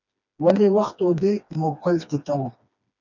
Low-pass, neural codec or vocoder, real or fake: 7.2 kHz; codec, 16 kHz, 2 kbps, FreqCodec, smaller model; fake